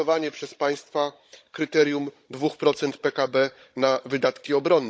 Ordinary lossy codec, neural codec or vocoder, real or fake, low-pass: none; codec, 16 kHz, 16 kbps, FunCodec, trained on Chinese and English, 50 frames a second; fake; none